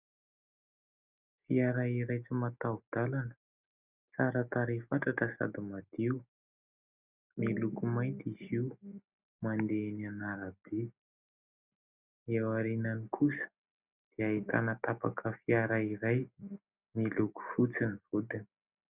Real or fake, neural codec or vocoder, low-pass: real; none; 3.6 kHz